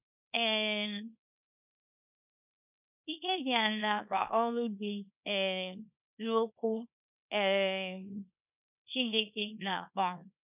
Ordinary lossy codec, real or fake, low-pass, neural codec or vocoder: none; fake; 3.6 kHz; codec, 24 kHz, 0.9 kbps, WavTokenizer, small release